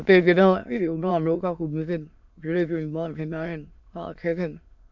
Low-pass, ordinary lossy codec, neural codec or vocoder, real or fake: 7.2 kHz; MP3, 64 kbps; autoencoder, 22.05 kHz, a latent of 192 numbers a frame, VITS, trained on many speakers; fake